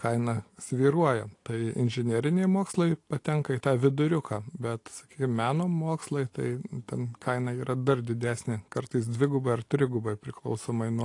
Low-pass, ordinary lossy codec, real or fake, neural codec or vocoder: 10.8 kHz; AAC, 48 kbps; real; none